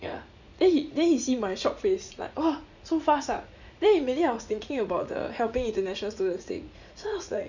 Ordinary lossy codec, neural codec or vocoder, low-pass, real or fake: none; vocoder, 44.1 kHz, 80 mel bands, Vocos; 7.2 kHz; fake